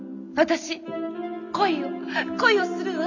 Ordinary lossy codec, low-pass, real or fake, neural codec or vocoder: none; 7.2 kHz; real; none